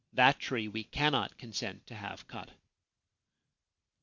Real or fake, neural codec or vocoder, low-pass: real; none; 7.2 kHz